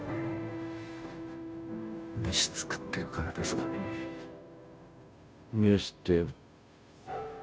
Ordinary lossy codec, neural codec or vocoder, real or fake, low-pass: none; codec, 16 kHz, 0.5 kbps, FunCodec, trained on Chinese and English, 25 frames a second; fake; none